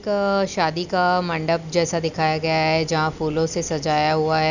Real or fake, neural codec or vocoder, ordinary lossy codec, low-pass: real; none; none; 7.2 kHz